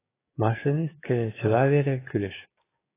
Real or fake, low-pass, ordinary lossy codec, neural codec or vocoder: fake; 3.6 kHz; AAC, 16 kbps; codec, 16 kHz, 6 kbps, DAC